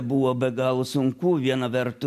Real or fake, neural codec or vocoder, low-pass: real; none; 14.4 kHz